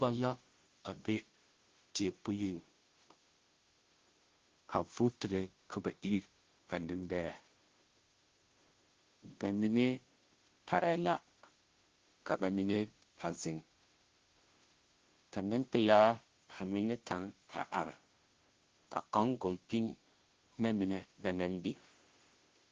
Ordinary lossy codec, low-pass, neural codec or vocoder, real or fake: Opus, 16 kbps; 7.2 kHz; codec, 16 kHz, 0.5 kbps, FunCodec, trained on Chinese and English, 25 frames a second; fake